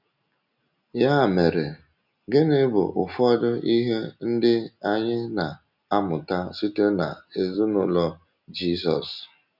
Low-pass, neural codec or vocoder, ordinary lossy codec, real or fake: 5.4 kHz; none; none; real